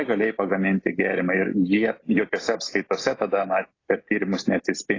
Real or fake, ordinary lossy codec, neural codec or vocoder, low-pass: real; AAC, 32 kbps; none; 7.2 kHz